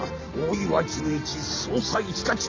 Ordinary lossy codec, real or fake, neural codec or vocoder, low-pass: MP3, 64 kbps; real; none; 7.2 kHz